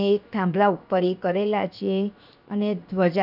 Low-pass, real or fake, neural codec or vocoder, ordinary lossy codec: 5.4 kHz; fake; codec, 16 kHz, 0.7 kbps, FocalCodec; none